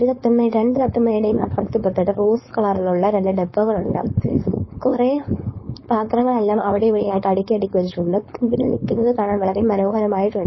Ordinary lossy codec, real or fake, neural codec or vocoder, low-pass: MP3, 24 kbps; fake; codec, 16 kHz, 4.8 kbps, FACodec; 7.2 kHz